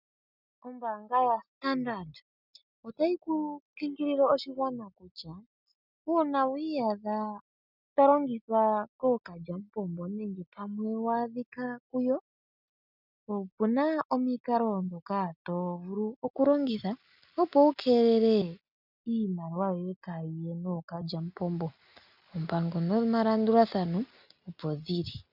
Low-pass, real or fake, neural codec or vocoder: 5.4 kHz; real; none